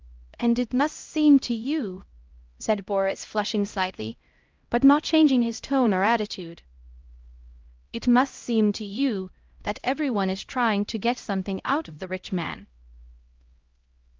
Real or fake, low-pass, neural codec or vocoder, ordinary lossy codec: fake; 7.2 kHz; codec, 16 kHz, 0.5 kbps, X-Codec, HuBERT features, trained on LibriSpeech; Opus, 32 kbps